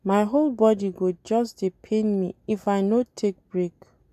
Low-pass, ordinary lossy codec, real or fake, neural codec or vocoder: 14.4 kHz; none; real; none